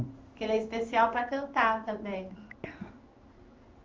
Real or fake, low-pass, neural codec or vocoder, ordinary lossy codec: fake; 7.2 kHz; codec, 16 kHz in and 24 kHz out, 1 kbps, XY-Tokenizer; Opus, 32 kbps